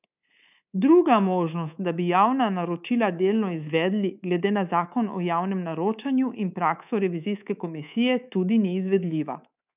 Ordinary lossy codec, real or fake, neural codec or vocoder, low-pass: none; fake; codec, 24 kHz, 3.1 kbps, DualCodec; 3.6 kHz